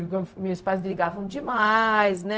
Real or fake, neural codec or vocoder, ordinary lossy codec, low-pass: fake; codec, 16 kHz, 0.4 kbps, LongCat-Audio-Codec; none; none